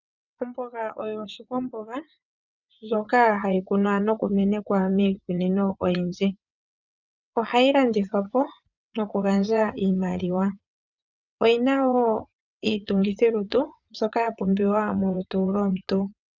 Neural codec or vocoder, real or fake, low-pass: vocoder, 22.05 kHz, 80 mel bands, WaveNeXt; fake; 7.2 kHz